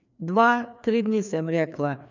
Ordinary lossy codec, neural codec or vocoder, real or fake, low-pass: none; codec, 16 kHz, 2 kbps, FreqCodec, larger model; fake; 7.2 kHz